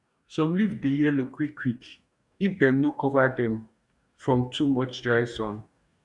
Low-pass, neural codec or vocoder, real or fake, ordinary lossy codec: 10.8 kHz; codec, 44.1 kHz, 2.6 kbps, DAC; fake; none